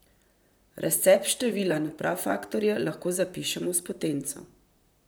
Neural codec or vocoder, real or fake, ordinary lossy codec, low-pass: vocoder, 44.1 kHz, 128 mel bands, Pupu-Vocoder; fake; none; none